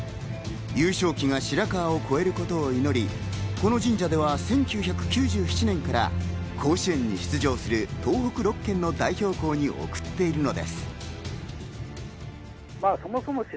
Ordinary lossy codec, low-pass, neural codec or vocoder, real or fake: none; none; none; real